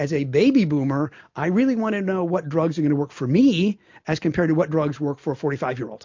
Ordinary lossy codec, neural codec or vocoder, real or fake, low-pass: MP3, 48 kbps; none; real; 7.2 kHz